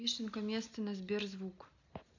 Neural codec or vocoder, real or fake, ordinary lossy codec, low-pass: none; real; none; 7.2 kHz